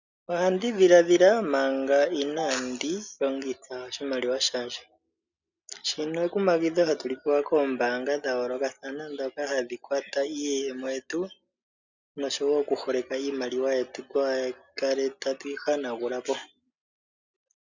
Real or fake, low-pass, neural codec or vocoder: real; 7.2 kHz; none